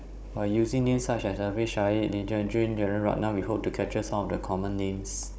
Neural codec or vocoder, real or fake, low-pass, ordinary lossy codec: codec, 16 kHz, 16 kbps, FunCodec, trained on Chinese and English, 50 frames a second; fake; none; none